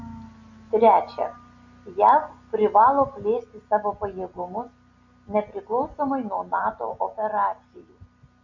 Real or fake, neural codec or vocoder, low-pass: real; none; 7.2 kHz